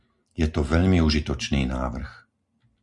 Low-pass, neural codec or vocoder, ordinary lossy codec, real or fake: 10.8 kHz; none; MP3, 96 kbps; real